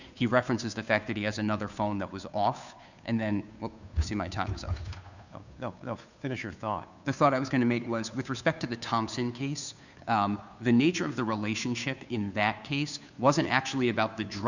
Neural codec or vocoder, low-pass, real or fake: codec, 16 kHz, 2 kbps, FunCodec, trained on Chinese and English, 25 frames a second; 7.2 kHz; fake